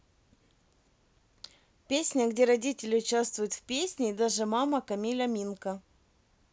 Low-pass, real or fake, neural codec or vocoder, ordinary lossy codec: none; real; none; none